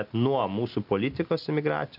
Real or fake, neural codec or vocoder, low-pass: real; none; 5.4 kHz